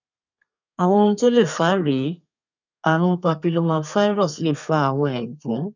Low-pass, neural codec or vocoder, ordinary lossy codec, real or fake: 7.2 kHz; codec, 32 kHz, 1.9 kbps, SNAC; none; fake